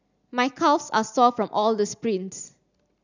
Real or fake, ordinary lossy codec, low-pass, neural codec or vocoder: real; none; 7.2 kHz; none